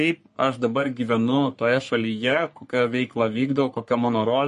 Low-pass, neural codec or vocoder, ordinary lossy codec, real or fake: 14.4 kHz; codec, 44.1 kHz, 3.4 kbps, Pupu-Codec; MP3, 48 kbps; fake